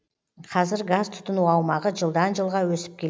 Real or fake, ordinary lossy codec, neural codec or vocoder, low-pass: real; none; none; none